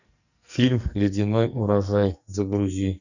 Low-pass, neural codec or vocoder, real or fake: 7.2 kHz; codec, 44.1 kHz, 2.6 kbps, SNAC; fake